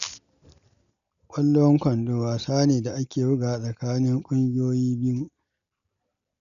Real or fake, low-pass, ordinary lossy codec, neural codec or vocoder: real; 7.2 kHz; none; none